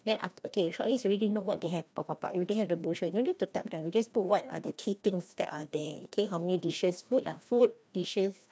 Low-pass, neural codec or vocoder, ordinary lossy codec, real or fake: none; codec, 16 kHz, 1 kbps, FreqCodec, larger model; none; fake